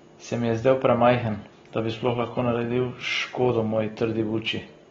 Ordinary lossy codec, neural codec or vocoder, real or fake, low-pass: AAC, 24 kbps; none; real; 7.2 kHz